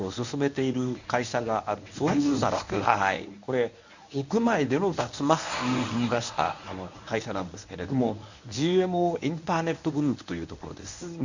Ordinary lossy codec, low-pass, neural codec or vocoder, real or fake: none; 7.2 kHz; codec, 24 kHz, 0.9 kbps, WavTokenizer, medium speech release version 1; fake